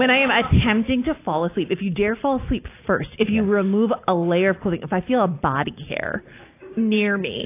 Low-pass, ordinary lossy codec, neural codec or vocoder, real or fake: 3.6 kHz; AAC, 24 kbps; none; real